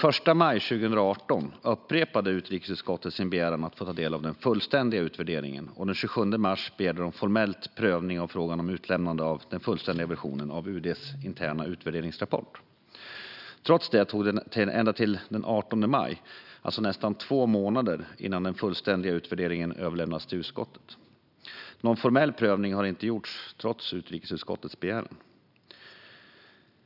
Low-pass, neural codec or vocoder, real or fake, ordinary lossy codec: 5.4 kHz; none; real; none